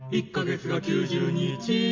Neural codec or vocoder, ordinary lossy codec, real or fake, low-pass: none; MP3, 64 kbps; real; 7.2 kHz